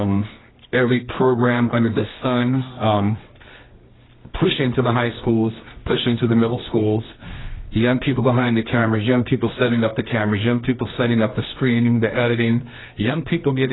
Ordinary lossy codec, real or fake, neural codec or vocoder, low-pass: AAC, 16 kbps; fake; codec, 24 kHz, 0.9 kbps, WavTokenizer, medium music audio release; 7.2 kHz